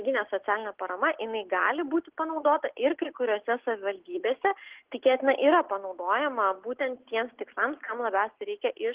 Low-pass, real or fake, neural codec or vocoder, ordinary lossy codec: 3.6 kHz; real; none; Opus, 16 kbps